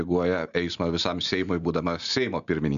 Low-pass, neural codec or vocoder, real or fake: 7.2 kHz; none; real